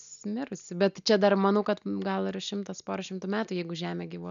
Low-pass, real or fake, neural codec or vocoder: 7.2 kHz; real; none